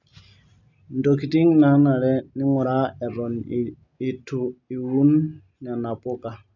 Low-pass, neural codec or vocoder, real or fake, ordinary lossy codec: 7.2 kHz; none; real; none